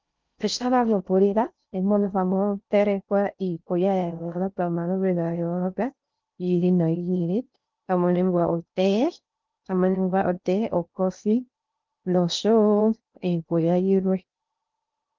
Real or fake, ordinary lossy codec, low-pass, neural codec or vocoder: fake; Opus, 24 kbps; 7.2 kHz; codec, 16 kHz in and 24 kHz out, 0.6 kbps, FocalCodec, streaming, 2048 codes